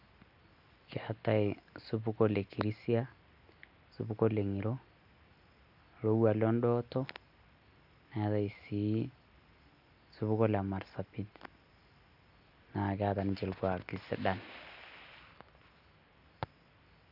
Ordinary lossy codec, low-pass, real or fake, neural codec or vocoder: none; 5.4 kHz; real; none